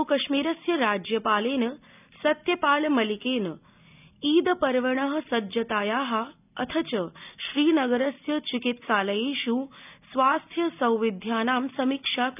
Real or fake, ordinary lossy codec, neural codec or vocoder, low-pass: real; none; none; 3.6 kHz